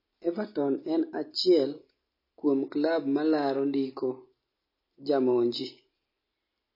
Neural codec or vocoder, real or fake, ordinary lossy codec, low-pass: none; real; MP3, 24 kbps; 5.4 kHz